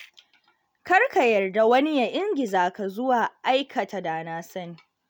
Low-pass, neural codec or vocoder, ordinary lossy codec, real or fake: none; none; none; real